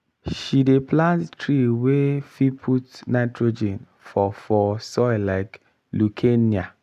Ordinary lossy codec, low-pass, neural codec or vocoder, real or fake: none; 14.4 kHz; none; real